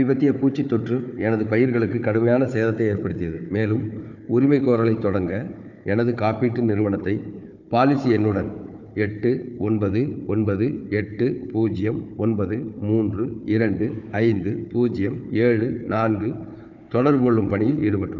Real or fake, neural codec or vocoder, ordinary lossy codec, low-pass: fake; codec, 16 kHz, 4 kbps, FunCodec, trained on Chinese and English, 50 frames a second; none; 7.2 kHz